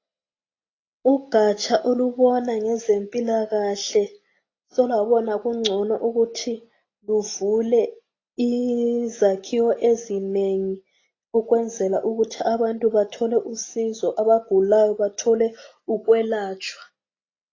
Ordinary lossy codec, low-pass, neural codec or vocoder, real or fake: AAC, 32 kbps; 7.2 kHz; none; real